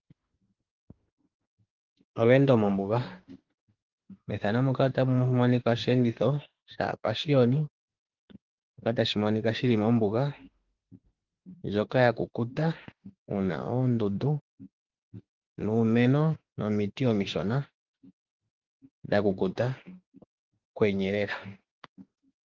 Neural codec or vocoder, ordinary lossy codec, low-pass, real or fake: autoencoder, 48 kHz, 32 numbers a frame, DAC-VAE, trained on Japanese speech; Opus, 16 kbps; 7.2 kHz; fake